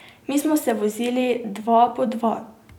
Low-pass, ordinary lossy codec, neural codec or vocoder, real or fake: 19.8 kHz; none; vocoder, 44.1 kHz, 128 mel bands every 256 samples, BigVGAN v2; fake